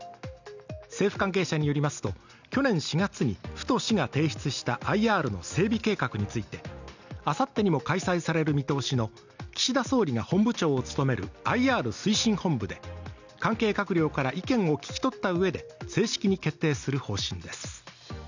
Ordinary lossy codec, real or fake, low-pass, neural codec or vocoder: none; real; 7.2 kHz; none